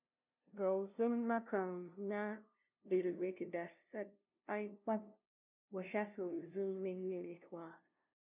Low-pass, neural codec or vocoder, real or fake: 3.6 kHz; codec, 16 kHz, 0.5 kbps, FunCodec, trained on LibriTTS, 25 frames a second; fake